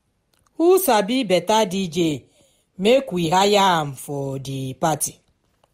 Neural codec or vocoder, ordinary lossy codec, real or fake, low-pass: none; MP3, 64 kbps; real; 19.8 kHz